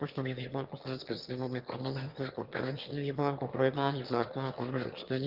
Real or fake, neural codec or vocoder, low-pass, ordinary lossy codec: fake; autoencoder, 22.05 kHz, a latent of 192 numbers a frame, VITS, trained on one speaker; 5.4 kHz; Opus, 32 kbps